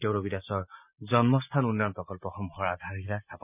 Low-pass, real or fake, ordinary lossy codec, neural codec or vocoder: 3.6 kHz; real; none; none